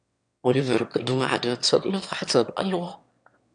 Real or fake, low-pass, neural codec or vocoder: fake; 9.9 kHz; autoencoder, 22.05 kHz, a latent of 192 numbers a frame, VITS, trained on one speaker